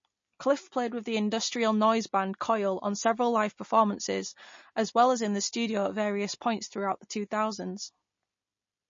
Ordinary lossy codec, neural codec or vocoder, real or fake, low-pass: MP3, 32 kbps; none; real; 7.2 kHz